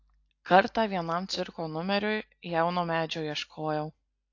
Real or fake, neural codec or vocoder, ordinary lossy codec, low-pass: real; none; AAC, 48 kbps; 7.2 kHz